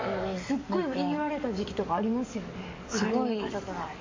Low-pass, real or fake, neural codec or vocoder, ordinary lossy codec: 7.2 kHz; fake; codec, 44.1 kHz, 7.8 kbps, DAC; MP3, 48 kbps